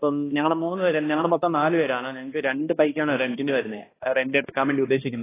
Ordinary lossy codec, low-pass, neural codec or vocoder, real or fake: AAC, 16 kbps; 3.6 kHz; codec, 16 kHz, 1 kbps, X-Codec, HuBERT features, trained on balanced general audio; fake